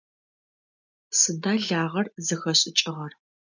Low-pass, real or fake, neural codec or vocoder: 7.2 kHz; real; none